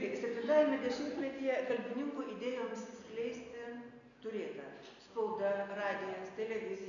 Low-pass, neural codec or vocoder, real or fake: 7.2 kHz; none; real